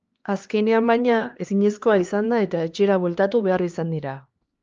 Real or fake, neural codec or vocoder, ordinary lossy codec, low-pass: fake; codec, 16 kHz, 2 kbps, X-Codec, HuBERT features, trained on LibriSpeech; Opus, 24 kbps; 7.2 kHz